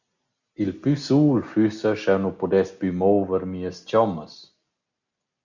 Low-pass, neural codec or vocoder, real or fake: 7.2 kHz; none; real